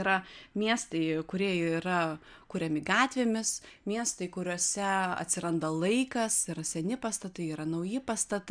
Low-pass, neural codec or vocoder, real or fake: 9.9 kHz; none; real